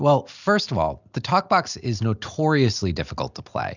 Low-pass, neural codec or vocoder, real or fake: 7.2 kHz; none; real